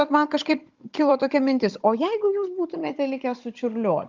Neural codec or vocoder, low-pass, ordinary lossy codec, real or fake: codec, 16 kHz, 16 kbps, FunCodec, trained on Chinese and English, 50 frames a second; 7.2 kHz; Opus, 24 kbps; fake